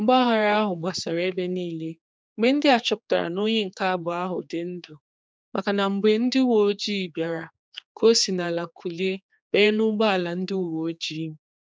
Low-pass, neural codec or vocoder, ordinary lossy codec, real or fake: none; codec, 16 kHz, 4 kbps, X-Codec, HuBERT features, trained on general audio; none; fake